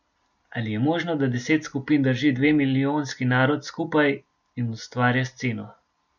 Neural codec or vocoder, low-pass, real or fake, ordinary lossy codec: none; 7.2 kHz; real; none